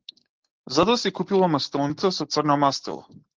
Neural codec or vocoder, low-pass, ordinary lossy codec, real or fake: none; 7.2 kHz; Opus, 16 kbps; real